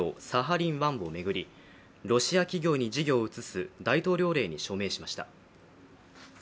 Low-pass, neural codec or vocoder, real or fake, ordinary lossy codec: none; none; real; none